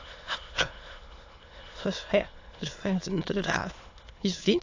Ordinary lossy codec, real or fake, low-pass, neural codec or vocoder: AAC, 48 kbps; fake; 7.2 kHz; autoencoder, 22.05 kHz, a latent of 192 numbers a frame, VITS, trained on many speakers